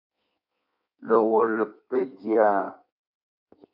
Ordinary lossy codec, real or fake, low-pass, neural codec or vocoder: MP3, 48 kbps; fake; 5.4 kHz; codec, 16 kHz in and 24 kHz out, 1.1 kbps, FireRedTTS-2 codec